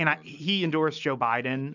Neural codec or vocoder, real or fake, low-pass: none; real; 7.2 kHz